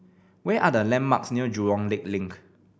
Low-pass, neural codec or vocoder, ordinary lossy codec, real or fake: none; none; none; real